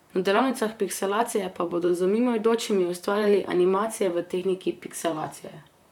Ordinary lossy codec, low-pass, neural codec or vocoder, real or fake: none; 19.8 kHz; vocoder, 44.1 kHz, 128 mel bands, Pupu-Vocoder; fake